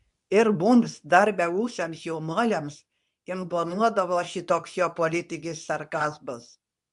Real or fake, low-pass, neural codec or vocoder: fake; 10.8 kHz; codec, 24 kHz, 0.9 kbps, WavTokenizer, medium speech release version 2